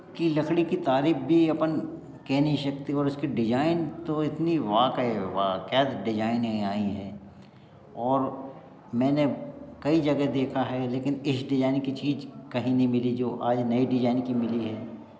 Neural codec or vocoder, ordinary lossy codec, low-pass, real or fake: none; none; none; real